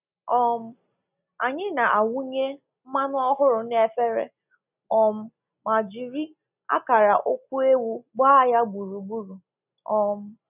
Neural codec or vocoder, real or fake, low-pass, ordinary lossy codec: none; real; 3.6 kHz; none